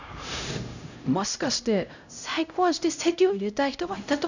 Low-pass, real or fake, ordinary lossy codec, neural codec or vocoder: 7.2 kHz; fake; none; codec, 16 kHz, 0.5 kbps, X-Codec, HuBERT features, trained on LibriSpeech